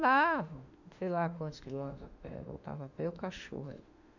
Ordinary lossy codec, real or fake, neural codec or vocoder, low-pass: none; fake; autoencoder, 48 kHz, 32 numbers a frame, DAC-VAE, trained on Japanese speech; 7.2 kHz